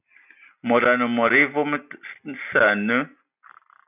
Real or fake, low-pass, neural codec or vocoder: real; 3.6 kHz; none